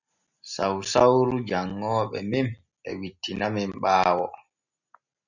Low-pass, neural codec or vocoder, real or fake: 7.2 kHz; none; real